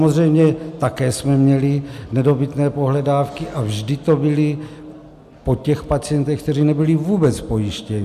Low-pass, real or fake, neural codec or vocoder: 14.4 kHz; real; none